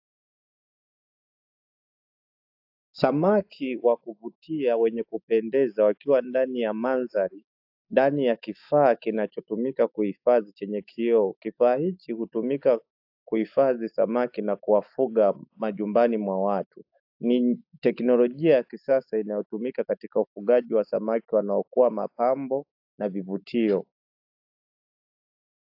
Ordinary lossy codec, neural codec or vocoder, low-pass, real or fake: AAC, 48 kbps; autoencoder, 48 kHz, 128 numbers a frame, DAC-VAE, trained on Japanese speech; 5.4 kHz; fake